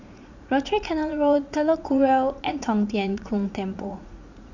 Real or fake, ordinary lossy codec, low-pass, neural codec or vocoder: fake; none; 7.2 kHz; vocoder, 44.1 kHz, 80 mel bands, Vocos